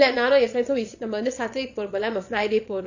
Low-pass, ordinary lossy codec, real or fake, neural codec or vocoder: 7.2 kHz; none; fake; vocoder, 22.05 kHz, 80 mel bands, Vocos